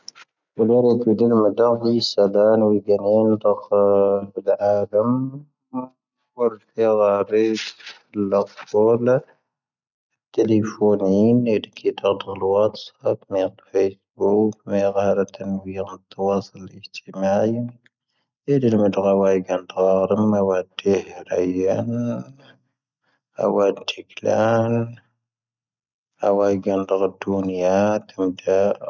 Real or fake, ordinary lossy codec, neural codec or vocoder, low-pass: real; none; none; 7.2 kHz